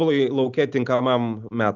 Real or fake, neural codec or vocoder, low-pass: real; none; 7.2 kHz